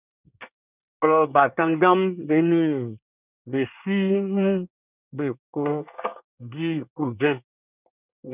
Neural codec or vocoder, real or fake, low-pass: codec, 24 kHz, 1 kbps, SNAC; fake; 3.6 kHz